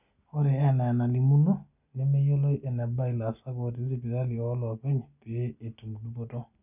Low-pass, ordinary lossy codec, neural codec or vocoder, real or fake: 3.6 kHz; none; none; real